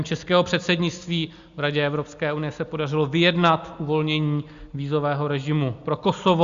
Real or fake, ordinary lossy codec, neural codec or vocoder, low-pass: real; Opus, 64 kbps; none; 7.2 kHz